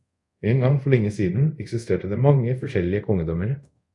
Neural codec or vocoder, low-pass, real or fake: codec, 24 kHz, 0.5 kbps, DualCodec; 10.8 kHz; fake